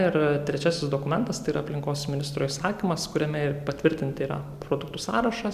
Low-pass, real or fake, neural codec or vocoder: 14.4 kHz; real; none